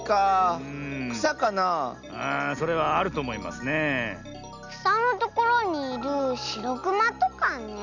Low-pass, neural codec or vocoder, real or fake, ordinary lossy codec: 7.2 kHz; none; real; none